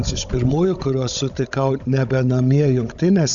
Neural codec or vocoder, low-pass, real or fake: codec, 16 kHz, 16 kbps, FunCodec, trained on Chinese and English, 50 frames a second; 7.2 kHz; fake